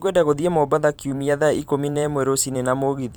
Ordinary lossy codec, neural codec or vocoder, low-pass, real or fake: none; vocoder, 44.1 kHz, 128 mel bands every 512 samples, BigVGAN v2; none; fake